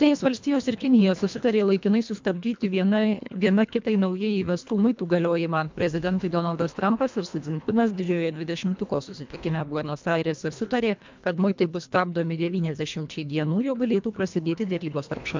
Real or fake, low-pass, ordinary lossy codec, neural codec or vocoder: fake; 7.2 kHz; MP3, 64 kbps; codec, 24 kHz, 1.5 kbps, HILCodec